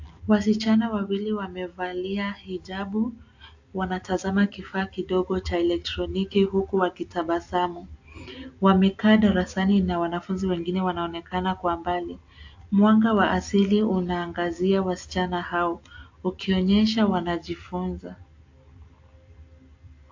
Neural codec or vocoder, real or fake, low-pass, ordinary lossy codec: none; real; 7.2 kHz; AAC, 48 kbps